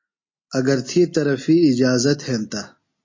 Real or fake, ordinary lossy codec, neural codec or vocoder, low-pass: real; MP3, 32 kbps; none; 7.2 kHz